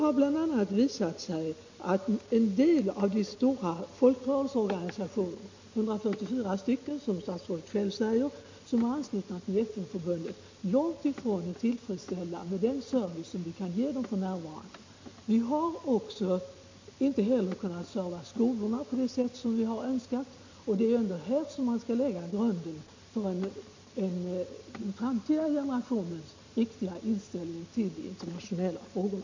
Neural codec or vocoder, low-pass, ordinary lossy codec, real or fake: none; 7.2 kHz; MP3, 48 kbps; real